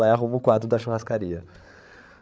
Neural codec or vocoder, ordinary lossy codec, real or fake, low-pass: codec, 16 kHz, 8 kbps, FreqCodec, larger model; none; fake; none